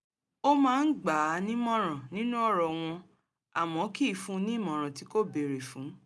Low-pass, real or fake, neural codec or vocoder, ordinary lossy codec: none; real; none; none